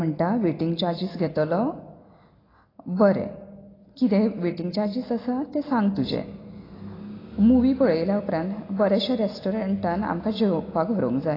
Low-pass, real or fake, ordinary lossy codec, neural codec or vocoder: 5.4 kHz; real; AAC, 24 kbps; none